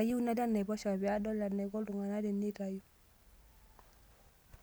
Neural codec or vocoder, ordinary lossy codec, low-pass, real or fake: none; none; none; real